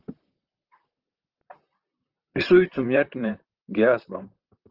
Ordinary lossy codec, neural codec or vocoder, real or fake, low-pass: Opus, 16 kbps; vocoder, 44.1 kHz, 128 mel bands, Pupu-Vocoder; fake; 5.4 kHz